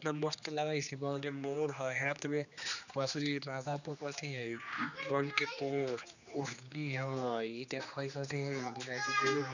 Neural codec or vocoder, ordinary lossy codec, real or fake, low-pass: codec, 16 kHz, 2 kbps, X-Codec, HuBERT features, trained on general audio; none; fake; 7.2 kHz